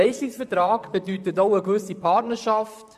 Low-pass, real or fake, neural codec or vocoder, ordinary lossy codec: 14.4 kHz; fake; vocoder, 44.1 kHz, 128 mel bands, Pupu-Vocoder; AAC, 96 kbps